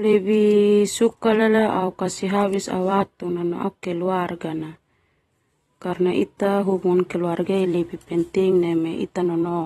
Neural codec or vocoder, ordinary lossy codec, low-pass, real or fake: vocoder, 44.1 kHz, 128 mel bands every 256 samples, BigVGAN v2; AAC, 32 kbps; 19.8 kHz; fake